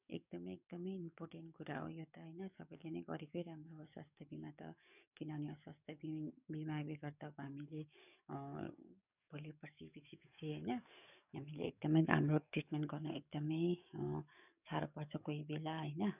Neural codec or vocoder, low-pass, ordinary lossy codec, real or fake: codec, 44.1 kHz, 7.8 kbps, DAC; 3.6 kHz; none; fake